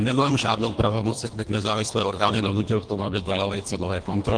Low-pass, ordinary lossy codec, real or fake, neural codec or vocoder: 9.9 kHz; Opus, 24 kbps; fake; codec, 24 kHz, 1.5 kbps, HILCodec